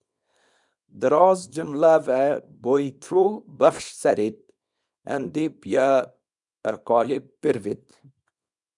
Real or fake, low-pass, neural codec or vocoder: fake; 10.8 kHz; codec, 24 kHz, 0.9 kbps, WavTokenizer, small release